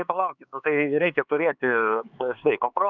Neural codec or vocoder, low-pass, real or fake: codec, 16 kHz, 4 kbps, X-Codec, HuBERT features, trained on LibriSpeech; 7.2 kHz; fake